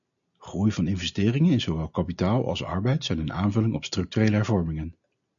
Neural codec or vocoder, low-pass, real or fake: none; 7.2 kHz; real